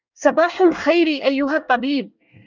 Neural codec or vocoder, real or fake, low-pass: codec, 24 kHz, 1 kbps, SNAC; fake; 7.2 kHz